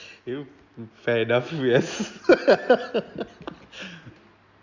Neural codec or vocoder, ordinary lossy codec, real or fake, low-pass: none; Opus, 64 kbps; real; 7.2 kHz